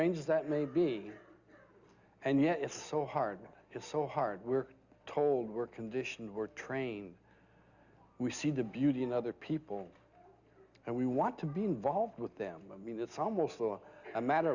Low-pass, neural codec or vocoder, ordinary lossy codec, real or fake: 7.2 kHz; none; Opus, 64 kbps; real